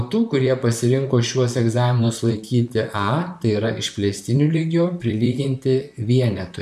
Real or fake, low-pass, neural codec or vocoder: fake; 14.4 kHz; vocoder, 44.1 kHz, 128 mel bands, Pupu-Vocoder